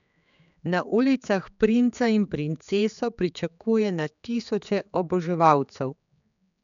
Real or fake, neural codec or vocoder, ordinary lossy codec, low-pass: fake; codec, 16 kHz, 4 kbps, X-Codec, HuBERT features, trained on general audio; none; 7.2 kHz